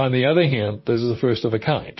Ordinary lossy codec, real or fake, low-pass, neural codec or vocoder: MP3, 24 kbps; fake; 7.2 kHz; vocoder, 44.1 kHz, 128 mel bands, Pupu-Vocoder